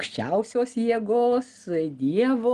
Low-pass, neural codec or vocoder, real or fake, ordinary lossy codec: 10.8 kHz; none; real; Opus, 24 kbps